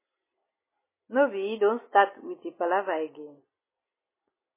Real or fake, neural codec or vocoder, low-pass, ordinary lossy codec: real; none; 3.6 kHz; MP3, 16 kbps